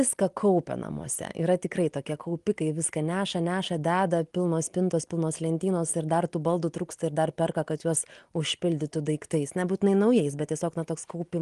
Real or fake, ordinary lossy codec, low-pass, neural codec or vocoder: real; Opus, 24 kbps; 10.8 kHz; none